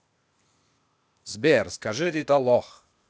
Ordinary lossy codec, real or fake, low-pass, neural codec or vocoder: none; fake; none; codec, 16 kHz, 0.8 kbps, ZipCodec